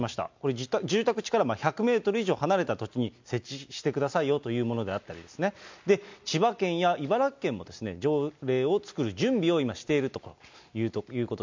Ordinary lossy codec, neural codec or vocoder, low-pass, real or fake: MP3, 64 kbps; none; 7.2 kHz; real